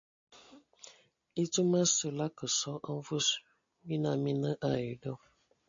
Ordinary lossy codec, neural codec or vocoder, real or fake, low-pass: MP3, 48 kbps; none; real; 7.2 kHz